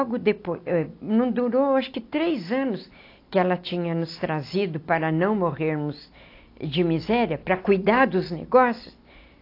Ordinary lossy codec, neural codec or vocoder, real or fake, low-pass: AAC, 32 kbps; none; real; 5.4 kHz